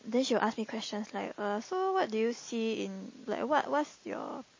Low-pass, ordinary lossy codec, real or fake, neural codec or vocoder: 7.2 kHz; MP3, 32 kbps; real; none